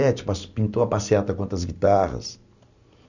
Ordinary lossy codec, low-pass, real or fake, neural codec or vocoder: none; 7.2 kHz; real; none